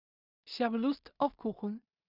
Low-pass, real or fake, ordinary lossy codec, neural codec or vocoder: 5.4 kHz; fake; none; codec, 16 kHz in and 24 kHz out, 0.4 kbps, LongCat-Audio-Codec, two codebook decoder